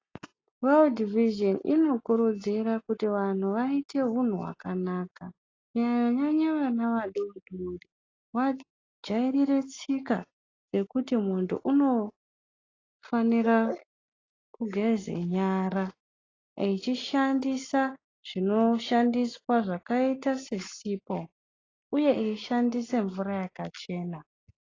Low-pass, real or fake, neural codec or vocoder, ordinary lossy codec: 7.2 kHz; real; none; AAC, 32 kbps